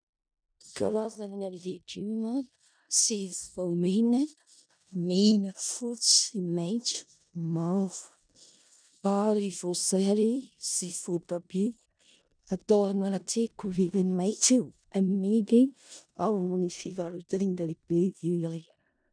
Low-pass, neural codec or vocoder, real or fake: 9.9 kHz; codec, 16 kHz in and 24 kHz out, 0.4 kbps, LongCat-Audio-Codec, four codebook decoder; fake